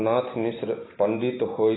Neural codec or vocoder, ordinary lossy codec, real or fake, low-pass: none; AAC, 16 kbps; real; 7.2 kHz